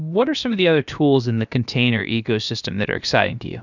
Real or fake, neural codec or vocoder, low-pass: fake; codec, 16 kHz, about 1 kbps, DyCAST, with the encoder's durations; 7.2 kHz